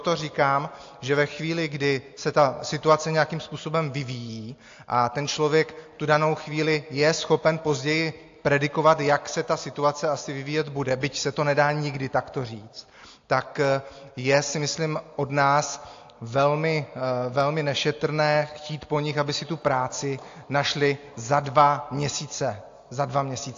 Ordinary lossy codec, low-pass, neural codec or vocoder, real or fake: AAC, 48 kbps; 7.2 kHz; none; real